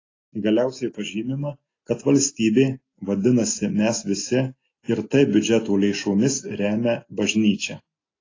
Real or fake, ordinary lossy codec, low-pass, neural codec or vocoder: real; AAC, 32 kbps; 7.2 kHz; none